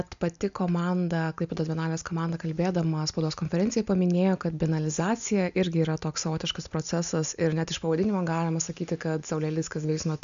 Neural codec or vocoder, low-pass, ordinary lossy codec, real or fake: none; 7.2 kHz; AAC, 96 kbps; real